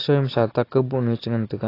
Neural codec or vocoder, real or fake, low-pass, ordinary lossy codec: none; real; 5.4 kHz; AAC, 24 kbps